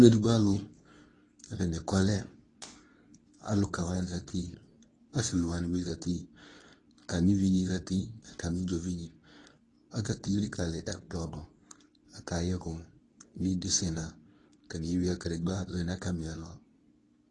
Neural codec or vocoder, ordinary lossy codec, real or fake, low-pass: codec, 24 kHz, 0.9 kbps, WavTokenizer, medium speech release version 2; AAC, 32 kbps; fake; 10.8 kHz